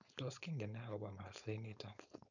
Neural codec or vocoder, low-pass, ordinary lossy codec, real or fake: codec, 16 kHz, 4.8 kbps, FACodec; 7.2 kHz; none; fake